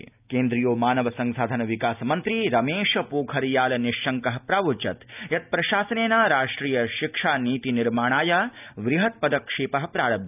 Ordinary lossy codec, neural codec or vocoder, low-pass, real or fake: none; none; 3.6 kHz; real